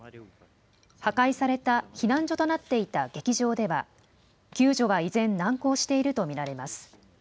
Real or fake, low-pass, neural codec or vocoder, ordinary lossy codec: real; none; none; none